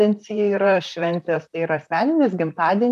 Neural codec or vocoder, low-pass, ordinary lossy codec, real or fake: vocoder, 44.1 kHz, 128 mel bands, Pupu-Vocoder; 14.4 kHz; MP3, 96 kbps; fake